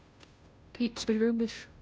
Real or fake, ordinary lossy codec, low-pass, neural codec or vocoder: fake; none; none; codec, 16 kHz, 0.5 kbps, FunCodec, trained on Chinese and English, 25 frames a second